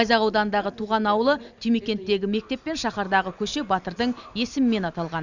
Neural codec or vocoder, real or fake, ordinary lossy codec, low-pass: none; real; none; 7.2 kHz